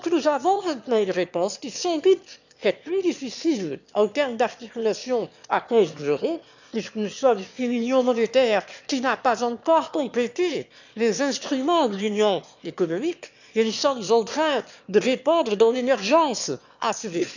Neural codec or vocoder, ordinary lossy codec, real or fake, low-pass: autoencoder, 22.05 kHz, a latent of 192 numbers a frame, VITS, trained on one speaker; none; fake; 7.2 kHz